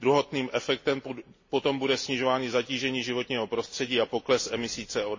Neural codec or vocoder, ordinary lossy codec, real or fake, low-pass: none; MP3, 32 kbps; real; 7.2 kHz